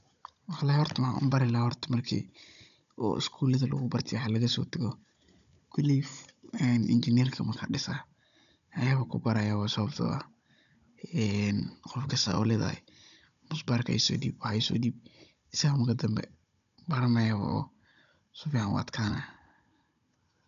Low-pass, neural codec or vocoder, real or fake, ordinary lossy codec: 7.2 kHz; codec, 16 kHz, 16 kbps, FunCodec, trained on Chinese and English, 50 frames a second; fake; none